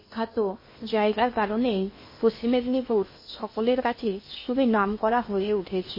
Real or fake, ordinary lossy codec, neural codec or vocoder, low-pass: fake; MP3, 24 kbps; codec, 16 kHz in and 24 kHz out, 0.8 kbps, FocalCodec, streaming, 65536 codes; 5.4 kHz